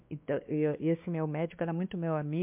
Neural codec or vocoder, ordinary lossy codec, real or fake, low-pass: codec, 16 kHz, 2 kbps, X-Codec, WavLM features, trained on Multilingual LibriSpeech; MP3, 32 kbps; fake; 3.6 kHz